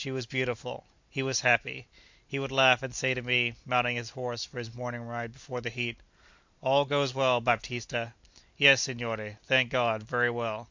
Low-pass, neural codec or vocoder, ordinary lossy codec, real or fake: 7.2 kHz; none; MP3, 64 kbps; real